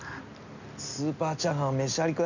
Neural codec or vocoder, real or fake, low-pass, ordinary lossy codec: none; real; 7.2 kHz; none